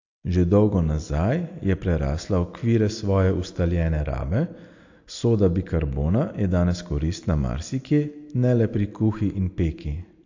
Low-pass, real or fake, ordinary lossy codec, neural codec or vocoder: 7.2 kHz; real; AAC, 48 kbps; none